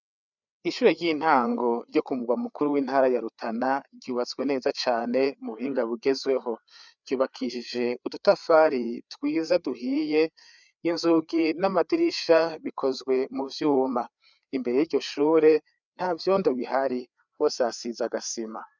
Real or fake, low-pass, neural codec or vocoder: fake; 7.2 kHz; codec, 16 kHz, 4 kbps, FreqCodec, larger model